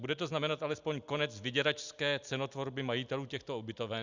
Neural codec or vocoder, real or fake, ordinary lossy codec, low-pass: none; real; Opus, 64 kbps; 7.2 kHz